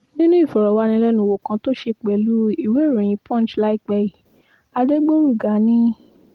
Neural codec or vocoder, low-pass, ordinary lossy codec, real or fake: none; 19.8 kHz; Opus, 24 kbps; real